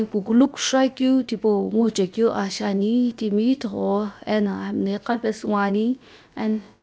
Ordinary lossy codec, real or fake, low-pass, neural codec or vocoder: none; fake; none; codec, 16 kHz, about 1 kbps, DyCAST, with the encoder's durations